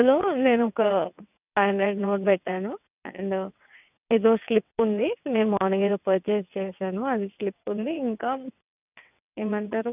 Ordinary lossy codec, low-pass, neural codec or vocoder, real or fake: none; 3.6 kHz; vocoder, 22.05 kHz, 80 mel bands, WaveNeXt; fake